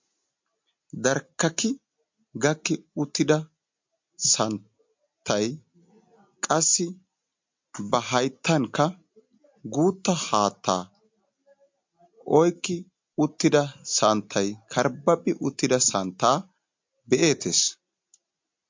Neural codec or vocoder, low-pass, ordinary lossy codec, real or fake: none; 7.2 kHz; MP3, 48 kbps; real